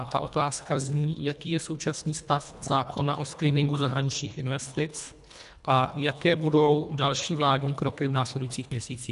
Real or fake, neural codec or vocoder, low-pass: fake; codec, 24 kHz, 1.5 kbps, HILCodec; 10.8 kHz